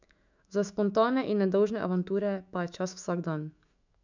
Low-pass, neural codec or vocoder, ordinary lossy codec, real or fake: 7.2 kHz; autoencoder, 48 kHz, 128 numbers a frame, DAC-VAE, trained on Japanese speech; none; fake